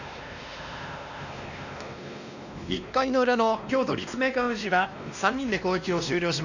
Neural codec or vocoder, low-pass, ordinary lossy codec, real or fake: codec, 16 kHz, 1 kbps, X-Codec, WavLM features, trained on Multilingual LibriSpeech; 7.2 kHz; none; fake